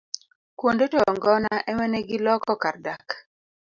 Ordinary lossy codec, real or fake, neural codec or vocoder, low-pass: Opus, 64 kbps; real; none; 7.2 kHz